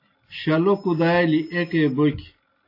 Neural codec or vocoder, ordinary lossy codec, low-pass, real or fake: none; AAC, 32 kbps; 5.4 kHz; real